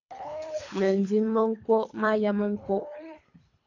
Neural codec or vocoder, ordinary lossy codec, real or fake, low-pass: codec, 24 kHz, 3 kbps, HILCodec; AAC, 48 kbps; fake; 7.2 kHz